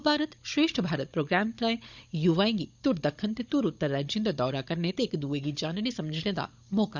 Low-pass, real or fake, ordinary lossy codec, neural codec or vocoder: 7.2 kHz; fake; none; codec, 16 kHz, 16 kbps, FunCodec, trained on Chinese and English, 50 frames a second